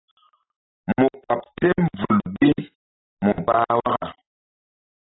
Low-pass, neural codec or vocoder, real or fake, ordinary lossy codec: 7.2 kHz; none; real; AAC, 16 kbps